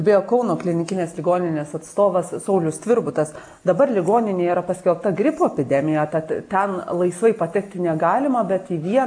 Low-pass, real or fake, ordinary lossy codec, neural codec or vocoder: 9.9 kHz; real; AAC, 64 kbps; none